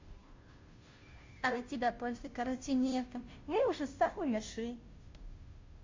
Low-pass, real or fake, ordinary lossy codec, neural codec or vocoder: 7.2 kHz; fake; MP3, 48 kbps; codec, 16 kHz, 0.5 kbps, FunCodec, trained on Chinese and English, 25 frames a second